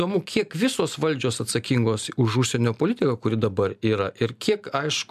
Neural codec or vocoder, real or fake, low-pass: none; real; 14.4 kHz